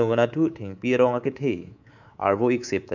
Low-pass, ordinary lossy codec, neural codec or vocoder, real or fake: 7.2 kHz; none; codec, 24 kHz, 3.1 kbps, DualCodec; fake